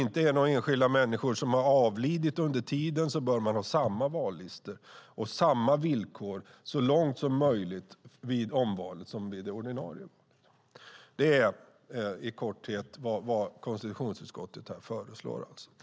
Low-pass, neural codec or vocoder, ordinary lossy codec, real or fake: none; none; none; real